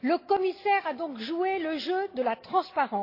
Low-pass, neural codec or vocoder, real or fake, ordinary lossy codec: 5.4 kHz; none; real; AAC, 32 kbps